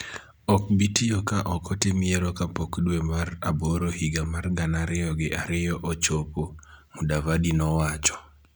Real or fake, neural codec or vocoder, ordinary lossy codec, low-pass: real; none; none; none